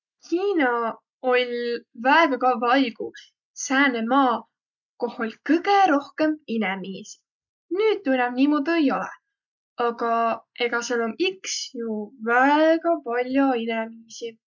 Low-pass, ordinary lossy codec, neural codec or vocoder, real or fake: 7.2 kHz; none; none; real